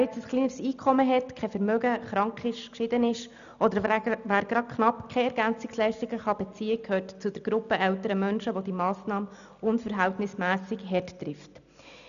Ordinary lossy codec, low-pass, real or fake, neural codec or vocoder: none; 7.2 kHz; real; none